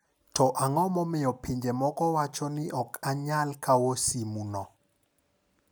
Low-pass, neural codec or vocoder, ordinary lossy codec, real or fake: none; none; none; real